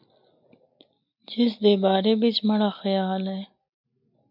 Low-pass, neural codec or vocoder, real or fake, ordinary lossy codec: 5.4 kHz; none; real; MP3, 48 kbps